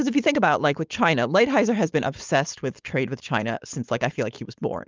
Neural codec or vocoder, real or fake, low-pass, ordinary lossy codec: codec, 16 kHz, 4.8 kbps, FACodec; fake; 7.2 kHz; Opus, 32 kbps